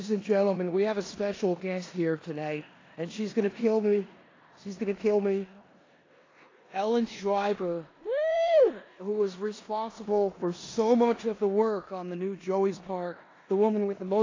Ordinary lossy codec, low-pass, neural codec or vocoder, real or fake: AAC, 32 kbps; 7.2 kHz; codec, 16 kHz in and 24 kHz out, 0.9 kbps, LongCat-Audio-Codec, four codebook decoder; fake